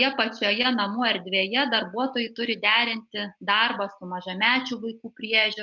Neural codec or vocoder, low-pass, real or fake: none; 7.2 kHz; real